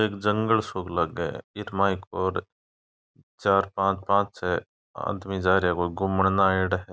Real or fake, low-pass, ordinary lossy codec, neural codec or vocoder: real; none; none; none